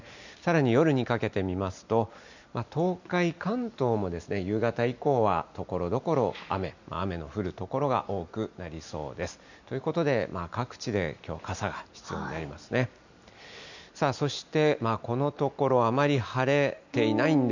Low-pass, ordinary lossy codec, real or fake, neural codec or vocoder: 7.2 kHz; none; real; none